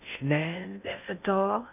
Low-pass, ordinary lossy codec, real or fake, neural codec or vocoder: 3.6 kHz; none; fake; codec, 16 kHz in and 24 kHz out, 0.6 kbps, FocalCodec, streaming, 2048 codes